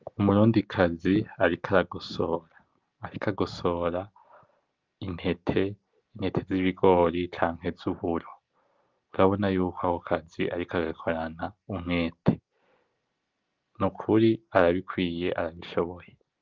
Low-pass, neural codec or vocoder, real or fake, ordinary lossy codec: 7.2 kHz; none; real; Opus, 32 kbps